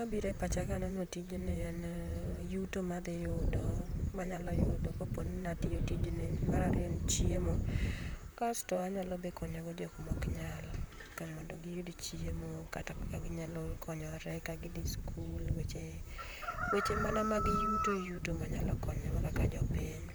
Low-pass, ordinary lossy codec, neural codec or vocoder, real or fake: none; none; vocoder, 44.1 kHz, 128 mel bands, Pupu-Vocoder; fake